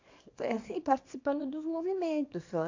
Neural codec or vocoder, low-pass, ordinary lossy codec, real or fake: codec, 24 kHz, 0.9 kbps, WavTokenizer, small release; 7.2 kHz; AAC, 48 kbps; fake